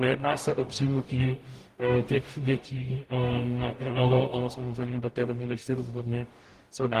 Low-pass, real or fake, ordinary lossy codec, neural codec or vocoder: 14.4 kHz; fake; Opus, 24 kbps; codec, 44.1 kHz, 0.9 kbps, DAC